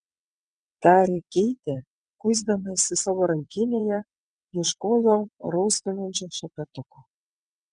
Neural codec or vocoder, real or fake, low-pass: vocoder, 22.05 kHz, 80 mel bands, WaveNeXt; fake; 9.9 kHz